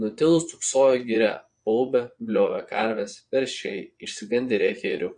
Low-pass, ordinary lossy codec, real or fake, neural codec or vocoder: 9.9 kHz; MP3, 48 kbps; fake; vocoder, 22.05 kHz, 80 mel bands, Vocos